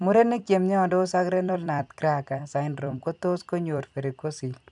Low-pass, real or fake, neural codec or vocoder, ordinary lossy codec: 10.8 kHz; fake; vocoder, 44.1 kHz, 128 mel bands every 256 samples, BigVGAN v2; none